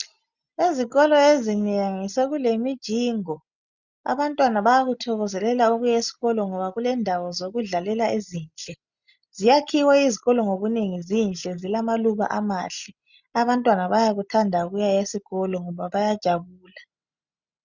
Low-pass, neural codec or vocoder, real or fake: 7.2 kHz; none; real